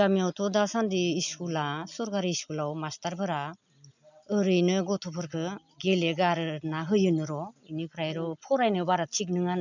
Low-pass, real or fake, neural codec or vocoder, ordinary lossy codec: 7.2 kHz; real; none; none